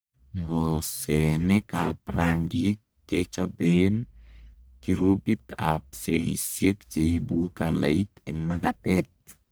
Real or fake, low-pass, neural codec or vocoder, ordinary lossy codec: fake; none; codec, 44.1 kHz, 1.7 kbps, Pupu-Codec; none